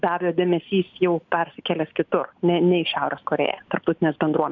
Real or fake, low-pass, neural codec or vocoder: real; 7.2 kHz; none